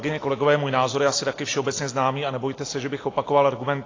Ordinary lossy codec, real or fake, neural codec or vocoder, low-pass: AAC, 32 kbps; real; none; 7.2 kHz